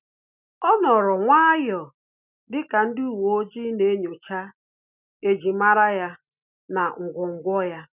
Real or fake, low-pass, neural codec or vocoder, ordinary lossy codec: real; 3.6 kHz; none; none